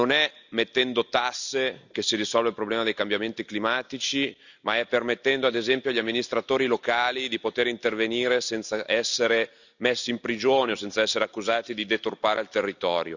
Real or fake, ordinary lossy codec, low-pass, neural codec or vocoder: real; none; 7.2 kHz; none